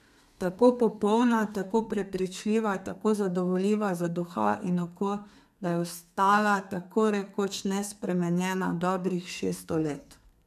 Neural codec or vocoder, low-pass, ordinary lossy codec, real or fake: codec, 32 kHz, 1.9 kbps, SNAC; 14.4 kHz; none; fake